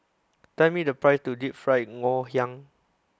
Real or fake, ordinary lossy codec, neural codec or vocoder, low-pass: real; none; none; none